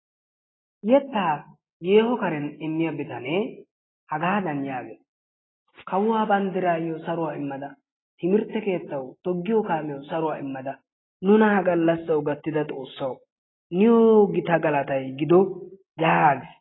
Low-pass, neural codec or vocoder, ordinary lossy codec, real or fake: 7.2 kHz; none; AAC, 16 kbps; real